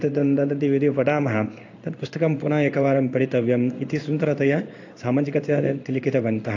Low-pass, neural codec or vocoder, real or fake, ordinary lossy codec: 7.2 kHz; codec, 16 kHz in and 24 kHz out, 1 kbps, XY-Tokenizer; fake; AAC, 48 kbps